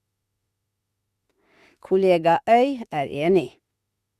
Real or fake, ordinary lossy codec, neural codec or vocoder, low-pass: fake; Opus, 64 kbps; autoencoder, 48 kHz, 32 numbers a frame, DAC-VAE, trained on Japanese speech; 14.4 kHz